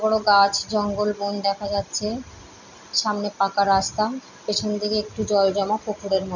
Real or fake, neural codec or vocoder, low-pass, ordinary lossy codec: real; none; 7.2 kHz; none